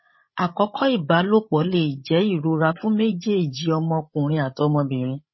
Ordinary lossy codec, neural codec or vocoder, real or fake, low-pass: MP3, 24 kbps; codec, 16 kHz, 16 kbps, FreqCodec, larger model; fake; 7.2 kHz